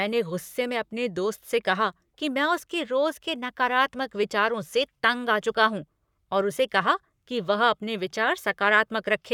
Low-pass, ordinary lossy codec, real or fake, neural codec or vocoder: 19.8 kHz; none; fake; codec, 44.1 kHz, 7.8 kbps, Pupu-Codec